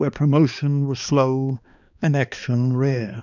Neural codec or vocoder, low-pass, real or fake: codec, 16 kHz, 2 kbps, X-Codec, HuBERT features, trained on balanced general audio; 7.2 kHz; fake